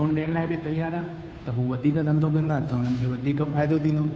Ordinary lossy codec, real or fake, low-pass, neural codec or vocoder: none; fake; none; codec, 16 kHz, 2 kbps, FunCodec, trained on Chinese and English, 25 frames a second